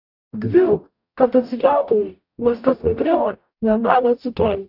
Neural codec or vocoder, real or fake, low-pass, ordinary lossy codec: codec, 44.1 kHz, 0.9 kbps, DAC; fake; 5.4 kHz; AAC, 48 kbps